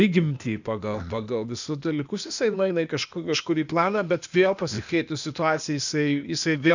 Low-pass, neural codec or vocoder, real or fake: 7.2 kHz; codec, 16 kHz, 0.8 kbps, ZipCodec; fake